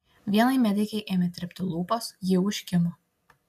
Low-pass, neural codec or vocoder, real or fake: 14.4 kHz; none; real